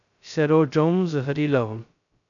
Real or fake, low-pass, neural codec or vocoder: fake; 7.2 kHz; codec, 16 kHz, 0.2 kbps, FocalCodec